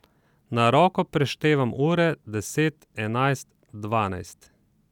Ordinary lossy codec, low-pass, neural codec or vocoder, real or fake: none; 19.8 kHz; none; real